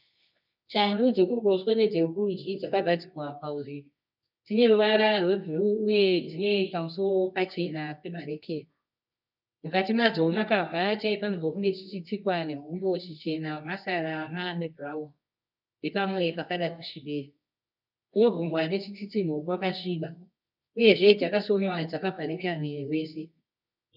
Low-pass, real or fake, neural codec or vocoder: 5.4 kHz; fake; codec, 24 kHz, 0.9 kbps, WavTokenizer, medium music audio release